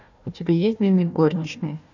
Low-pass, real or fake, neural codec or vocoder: 7.2 kHz; fake; codec, 16 kHz, 1 kbps, FunCodec, trained on Chinese and English, 50 frames a second